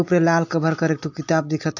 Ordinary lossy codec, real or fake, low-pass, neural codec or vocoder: AAC, 48 kbps; real; 7.2 kHz; none